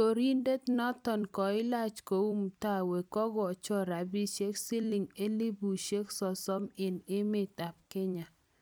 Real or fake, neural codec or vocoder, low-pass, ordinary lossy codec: fake; vocoder, 44.1 kHz, 128 mel bands every 256 samples, BigVGAN v2; none; none